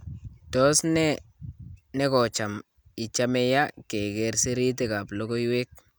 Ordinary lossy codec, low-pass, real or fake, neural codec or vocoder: none; none; real; none